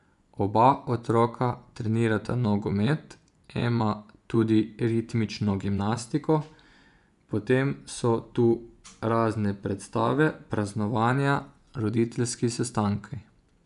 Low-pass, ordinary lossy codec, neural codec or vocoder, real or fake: 10.8 kHz; none; vocoder, 24 kHz, 100 mel bands, Vocos; fake